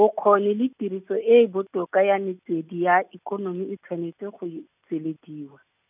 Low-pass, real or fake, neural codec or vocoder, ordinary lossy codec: 3.6 kHz; real; none; none